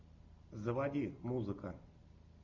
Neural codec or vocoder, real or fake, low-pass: none; real; 7.2 kHz